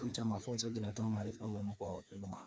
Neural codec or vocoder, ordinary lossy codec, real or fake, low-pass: codec, 16 kHz, 2 kbps, FreqCodec, larger model; none; fake; none